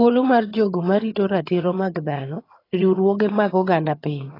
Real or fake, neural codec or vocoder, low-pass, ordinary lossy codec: fake; vocoder, 22.05 kHz, 80 mel bands, HiFi-GAN; 5.4 kHz; AAC, 24 kbps